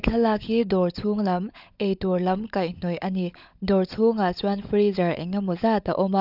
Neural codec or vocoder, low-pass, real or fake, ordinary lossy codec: codec, 16 kHz, 16 kbps, FunCodec, trained on LibriTTS, 50 frames a second; 5.4 kHz; fake; none